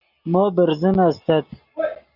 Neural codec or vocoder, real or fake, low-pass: none; real; 5.4 kHz